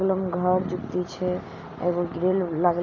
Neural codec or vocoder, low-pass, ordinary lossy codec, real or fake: none; 7.2 kHz; none; real